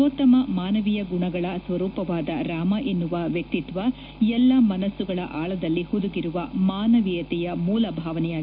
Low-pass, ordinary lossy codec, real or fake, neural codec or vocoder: 5.4 kHz; none; real; none